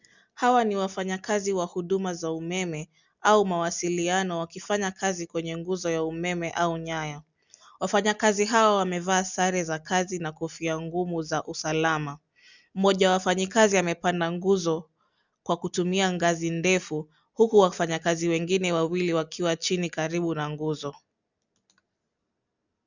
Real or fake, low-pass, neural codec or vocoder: real; 7.2 kHz; none